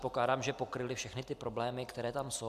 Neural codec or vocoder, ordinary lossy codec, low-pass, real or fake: none; AAC, 96 kbps; 14.4 kHz; real